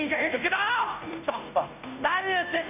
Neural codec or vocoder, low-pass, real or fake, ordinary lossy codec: codec, 16 kHz, 0.5 kbps, FunCodec, trained on Chinese and English, 25 frames a second; 3.6 kHz; fake; none